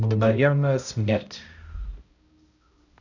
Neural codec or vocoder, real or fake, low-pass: codec, 16 kHz, 0.5 kbps, X-Codec, HuBERT features, trained on balanced general audio; fake; 7.2 kHz